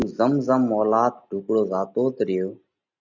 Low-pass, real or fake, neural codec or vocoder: 7.2 kHz; real; none